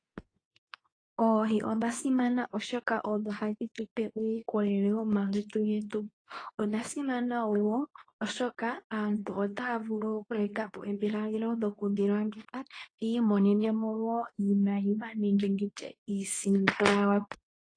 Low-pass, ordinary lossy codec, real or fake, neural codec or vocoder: 9.9 kHz; AAC, 32 kbps; fake; codec, 24 kHz, 0.9 kbps, WavTokenizer, medium speech release version 2